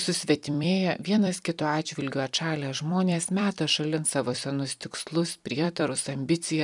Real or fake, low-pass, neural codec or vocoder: fake; 10.8 kHz; vocoder, 44.1 kHz, 128 mel bands every 256 samples, BigVGAN v2